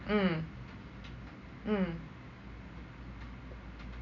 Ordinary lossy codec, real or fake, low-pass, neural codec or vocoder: none; real; 7.2 kHz; none